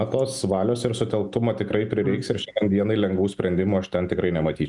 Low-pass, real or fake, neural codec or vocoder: 10.8 kHz; real; none